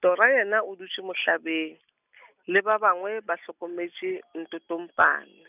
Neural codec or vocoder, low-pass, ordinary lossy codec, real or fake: none; 3.6 kHz; none; real